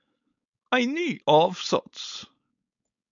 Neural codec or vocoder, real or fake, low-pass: codec, 16 kHz, 4.8 kbps, FACodec; fake; 7.2 kHz